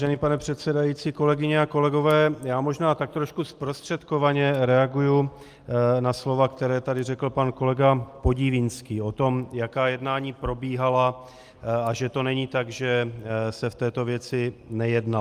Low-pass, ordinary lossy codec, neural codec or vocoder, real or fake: 14.4 kHz; Opus, 32 kbps; none; real